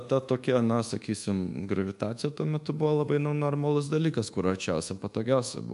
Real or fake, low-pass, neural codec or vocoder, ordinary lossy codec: fake; 10.8 kHz; codec, 24 kHz, 1.2 kbps, DualCodec; MP3, 64 kbps